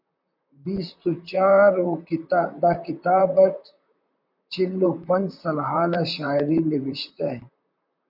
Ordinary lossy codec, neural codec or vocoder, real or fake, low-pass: MP3, 48 kbps; vocoder, 44.1 kHz, 128 mel bands, Pupu-Vocoder; fake; 5.4 kHz